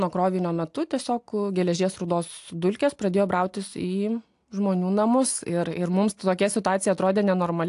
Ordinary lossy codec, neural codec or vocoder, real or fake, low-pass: AAC, 64 kbps; none; real; 10.8 kHz